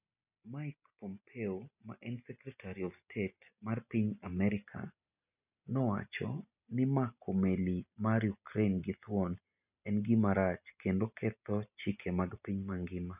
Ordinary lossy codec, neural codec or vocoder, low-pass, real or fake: none; none; 3.6 kHz; real